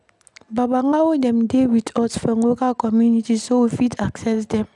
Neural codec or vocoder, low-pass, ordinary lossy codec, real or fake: none; 10.8 kHz; none; real